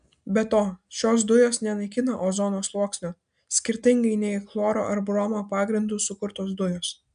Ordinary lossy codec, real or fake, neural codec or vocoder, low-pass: AAC, 96 kbps; real; none; 9.9 kHz